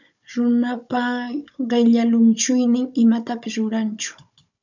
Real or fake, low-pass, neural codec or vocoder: fake; 7.2 kHz; codec, 16 kHz, 16 kbps, FunCodec, trained on Chinese and English, 50 frames a second